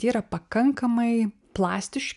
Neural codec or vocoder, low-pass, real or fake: none; 10.8 kHz; real